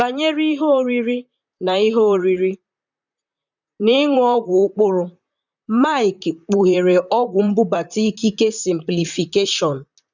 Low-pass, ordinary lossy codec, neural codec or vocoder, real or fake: 7.2 kHz; none; vocoder, 44.1 kHz, 128 mel bands, Pupu-Vocoder; fake